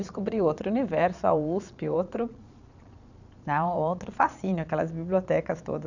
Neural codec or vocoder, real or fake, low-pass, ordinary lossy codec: vocoder, 22.05 kHz, 80 mel bands, Vocos; fake; 7.2 kHz; none